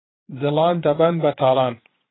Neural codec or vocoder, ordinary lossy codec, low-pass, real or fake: vocoder, 22.05 kHz, 80 mel bands, Vocos; AAC, 16 kbps; 7.2 kHz; fake